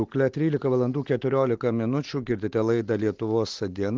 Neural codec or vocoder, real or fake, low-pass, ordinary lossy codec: none; real; 7.2 kHz; Opus, 16 kbps